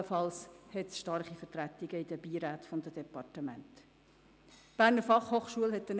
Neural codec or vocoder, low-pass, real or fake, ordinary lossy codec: none; none; real; none